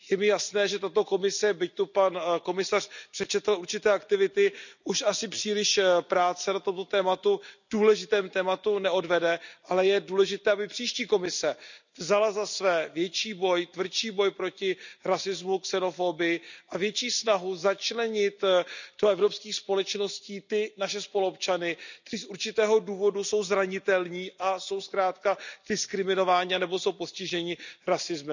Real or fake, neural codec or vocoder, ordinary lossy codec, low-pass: real; none; none; 7.2 kHz